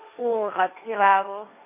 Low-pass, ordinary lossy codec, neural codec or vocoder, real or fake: 3.6 kHz; MP3, 24 kbps; codec, 16 kHz, 1.1 kbps, Voila-Tokenizer; fake